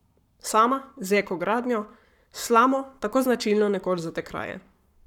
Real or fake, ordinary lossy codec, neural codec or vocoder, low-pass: fake; none; codec, 44.1 kHz, 7.8 kbps, Pupu-Codec; 19.8 kHz